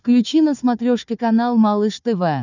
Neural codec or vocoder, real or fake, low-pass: codec, 16 kHz, 4 kbps, FunCodec, trained on Chinese and English, 50 frames a second; fake; 7.2 kHz